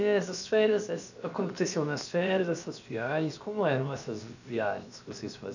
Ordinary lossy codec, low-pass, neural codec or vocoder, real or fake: none; 7.2 kHz; codec, 16 kHz, about 1 kbps, DyCAST, with the encoder's durations; fake